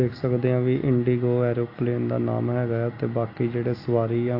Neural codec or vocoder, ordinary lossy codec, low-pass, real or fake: none; AAC, 32 kbps; 5.4 kHz; real